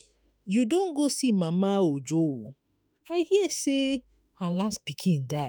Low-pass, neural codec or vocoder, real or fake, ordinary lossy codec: none; autoencoder, 48 kHz, 32 numbers a frame, DAC-VAE, trained on Japanese speech; fake; none